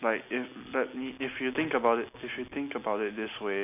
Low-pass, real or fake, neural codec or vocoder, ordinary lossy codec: 3.6 kHz; real; none; none